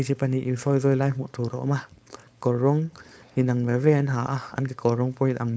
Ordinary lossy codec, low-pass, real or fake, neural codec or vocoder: none; none; fake; codec, 16 kHz, 4.8 kbps, FACodec